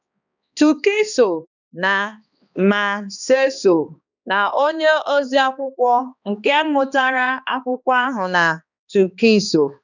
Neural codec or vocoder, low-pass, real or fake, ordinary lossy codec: codec, 16 kHz, 2 kbps, X-Codec, HuBERT features, trained on balanced general audio; 7.2 kHz; fake; none